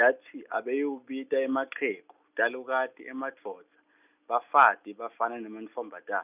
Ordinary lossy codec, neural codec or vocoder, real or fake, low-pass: none; none; real; 3.6 kHz